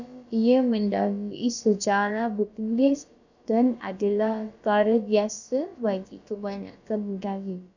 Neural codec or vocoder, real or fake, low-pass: codec, 16 kHz, about 1 kbps, DyCAST, with the encoder's durations; fake; 7.2 kHz